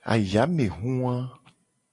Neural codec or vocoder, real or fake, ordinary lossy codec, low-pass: none; real; MP3, 48 kbps; 10.8 kHz